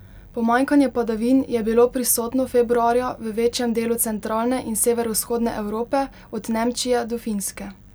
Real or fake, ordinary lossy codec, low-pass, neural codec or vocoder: real; none; none; none